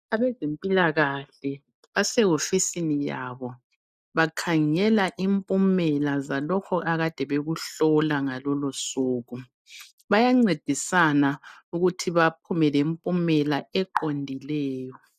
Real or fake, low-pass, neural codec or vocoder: real; 14.4 kHz; none